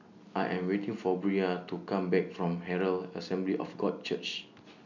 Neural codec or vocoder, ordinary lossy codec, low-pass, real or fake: none; none; 7.2 kHz; real